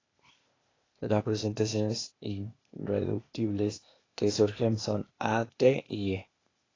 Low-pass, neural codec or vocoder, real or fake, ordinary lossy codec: 7.2 kHz; codec, 16 kHz, 0.8 kbps, ZipCodec; fake; AAC, 32 kbps